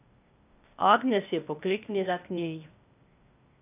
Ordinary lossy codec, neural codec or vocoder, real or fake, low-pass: none; codec, 16 kHz, 0.8 kbps, ZipCodec; fake; 3.6 kHz